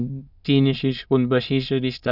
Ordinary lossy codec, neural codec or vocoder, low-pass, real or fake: none; autoencoder, 22.05 kHz, a latent of 192 numbers a frame, VITS, trained on many speakers; 5.4 kHz; fake